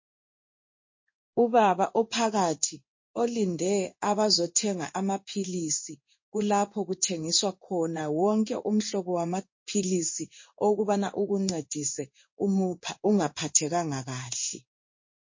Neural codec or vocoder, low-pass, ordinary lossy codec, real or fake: codec, 16 kHz in and 24 kHz out, 1 kbps, XY-Tokenizer; 7.2 kHz; MP3, 32 kbps; fake